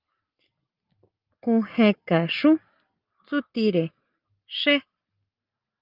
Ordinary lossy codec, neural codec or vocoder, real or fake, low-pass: Opus, 24 kbps; none; real; 5.4 kHz